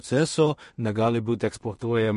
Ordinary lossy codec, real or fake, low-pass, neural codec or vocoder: MP3, 48 kbps; fake; 10.8 kHz; codec, 16 kHz in and 24 kHz out, 0.4 kbps, LongCat-Audio-Codec, two codebook decoder